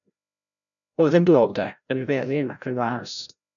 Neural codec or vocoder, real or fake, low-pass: codec, 16 kHz, 0.5 kbps, FreqCodec, larger model; fake; 7.2 kHz